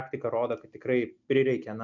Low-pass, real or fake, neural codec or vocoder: 7.2 kHz; real; none